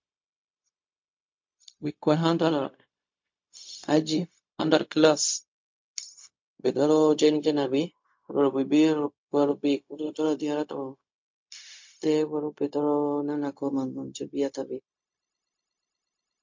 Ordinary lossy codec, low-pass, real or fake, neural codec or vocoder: MP3, 48 kbps; 7.2 kHz; fake; codec, 16 kHz, 0.4 kbps, LongCat-Audio-Codec